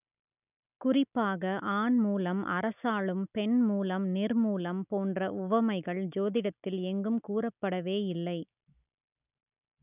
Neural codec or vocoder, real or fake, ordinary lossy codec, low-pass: none; real; none; 3.6 kHz